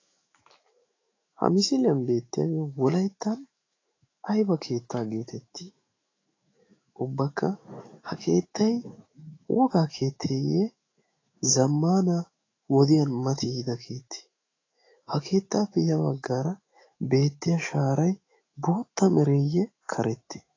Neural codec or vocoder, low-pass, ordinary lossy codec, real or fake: autoencoder, 48 kHz, 128 numbers a frame, DAC-VAE, trained on Japanese speech; 7.2 kHz; AAC, 32 kbps; fake